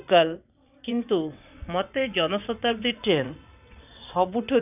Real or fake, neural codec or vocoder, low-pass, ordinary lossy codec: fake; vocoder, 22.05 kHz, 80 mel bands, WaveNeXt; 3.6 kHz; none